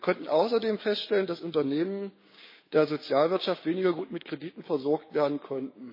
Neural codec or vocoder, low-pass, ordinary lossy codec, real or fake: codec, 16 kHz, 6 kbps, DAC; 5.4 kHz; MP3, 24 kbps; fake